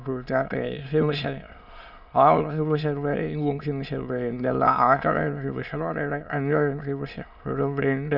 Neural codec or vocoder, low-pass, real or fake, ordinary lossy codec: autoencoder, 22.05 kHz, a latent of 192 numbers a frame, VITS, trained on many speakers; 5.4 kHz; fake; none